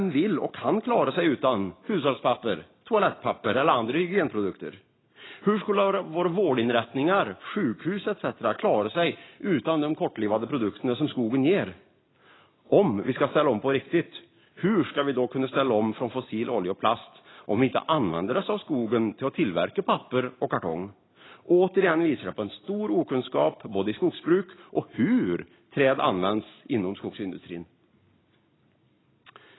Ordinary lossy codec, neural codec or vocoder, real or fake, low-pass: AAC, 16 kbps; none; real; 7.2 kHz